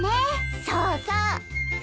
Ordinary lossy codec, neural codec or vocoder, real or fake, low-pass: none; none; real; none